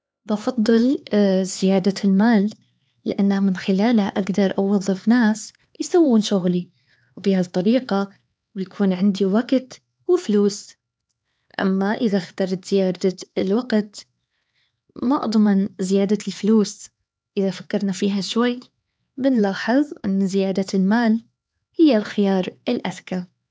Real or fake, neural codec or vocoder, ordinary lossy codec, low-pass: fake; codec, 16 kHz, 4 kbps, X-Codec, HuBERT features, trained on LibriSpeech; none; none